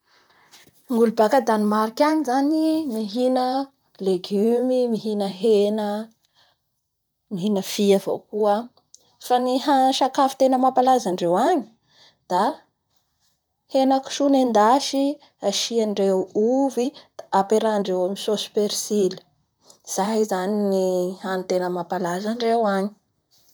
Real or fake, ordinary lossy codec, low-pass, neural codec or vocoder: fake; none; none; vocoder, 44.1 kHz, 128 mel bands, Pupu-Vocoder